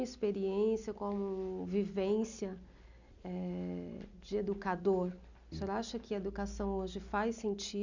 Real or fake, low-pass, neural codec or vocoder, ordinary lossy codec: real; 7.2 kHz; none; none